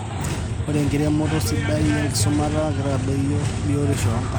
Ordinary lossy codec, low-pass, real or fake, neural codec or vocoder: none; none; real; none